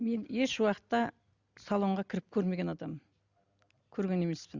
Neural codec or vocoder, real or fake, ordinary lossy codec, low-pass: none; real; Opus, 64 kbps; 7.2 kHz